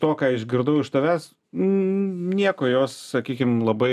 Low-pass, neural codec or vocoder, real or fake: 14.4 kHz; none; real